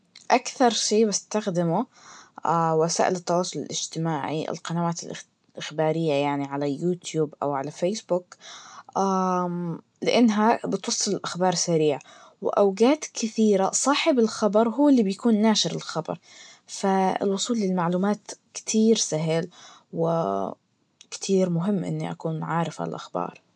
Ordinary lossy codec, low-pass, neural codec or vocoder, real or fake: none; 9.9 kHz; none; real